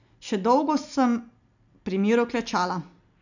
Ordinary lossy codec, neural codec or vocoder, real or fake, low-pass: none; none; real; 7.2 kHz